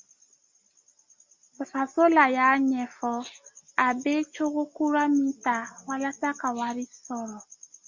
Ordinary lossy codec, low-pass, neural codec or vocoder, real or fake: MP3, 64 kbps; 7.2 kHz; none; real